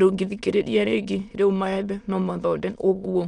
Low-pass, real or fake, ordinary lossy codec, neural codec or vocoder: 9.9 kHz; fake; none; autoencoder, 22.05 kHz, a latent of 192 numbers a frame, VITS, trained on many speakers